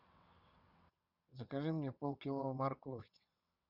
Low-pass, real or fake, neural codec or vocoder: 5.4 kHz; fake; vocoder, 22.05 kHz, 80 mel bands, WaveNeXt